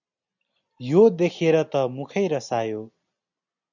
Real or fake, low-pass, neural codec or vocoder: real; 7.2 kHz; none